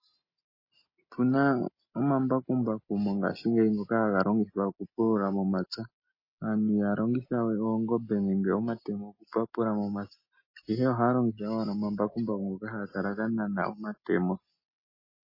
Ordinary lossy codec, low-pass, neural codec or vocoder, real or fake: MP3, 24 kbps; 5.4 kHz; none; real